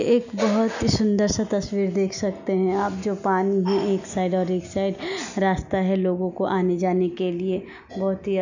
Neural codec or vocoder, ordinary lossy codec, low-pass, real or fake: none; none; 7.2 kHz; real